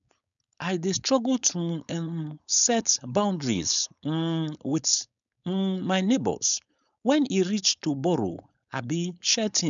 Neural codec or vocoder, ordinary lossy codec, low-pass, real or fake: codec, 16 kHz, 4.8 kbps, FACodec; none; 7.2 kHz; fake